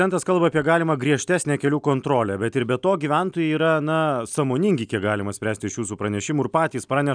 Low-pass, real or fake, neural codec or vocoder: 9.9 kHz; real; none